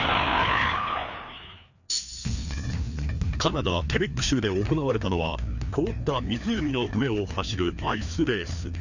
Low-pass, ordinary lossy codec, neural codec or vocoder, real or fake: 7.2 kHz; none; codec, 16 kHz, 2 kbps, FreqCodec, larger model; fake